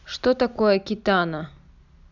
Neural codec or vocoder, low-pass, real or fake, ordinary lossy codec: none; 7.2 kHz; real; none